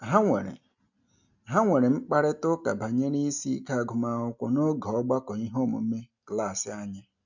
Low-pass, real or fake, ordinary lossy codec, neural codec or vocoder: 7.2 kHz; real; none; none